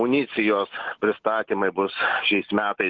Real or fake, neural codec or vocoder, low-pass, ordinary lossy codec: real; none; 7.2 kHz; Opus, 16 kbps